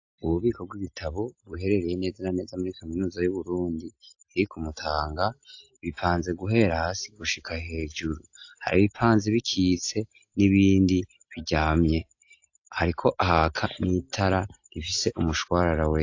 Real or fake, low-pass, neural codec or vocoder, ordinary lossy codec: real; 7.2 kHz; none; AAC, 48 kbps